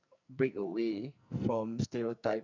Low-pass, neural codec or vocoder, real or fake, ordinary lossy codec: 7.2 kHz; codec, 44.1 kHz, 2.6 kbps, SNAC; fake; none